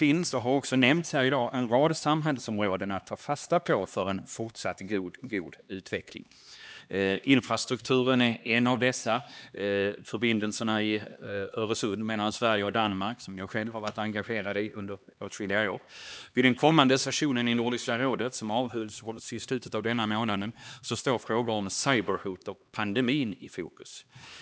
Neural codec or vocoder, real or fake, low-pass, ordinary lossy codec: codec, 16 kHz, 2 kbps, X-Codec, HuBERT features, trained on LibriSpeech; fake; none; none